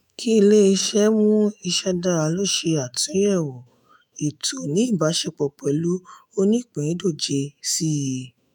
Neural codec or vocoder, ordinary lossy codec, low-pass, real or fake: autoencoder, 48 kHz, 128 numbers a frame, DAC-VAE, trained on Japanese speech; none; none; fake